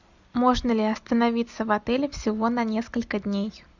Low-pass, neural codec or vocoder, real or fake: 7.2 kHz; none; real